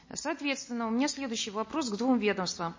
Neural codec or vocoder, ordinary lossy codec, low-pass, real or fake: none; MP3, 32 kbps; 7.2 kHz; real